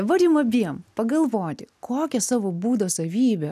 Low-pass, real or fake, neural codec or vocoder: 14.4 kHz; real; none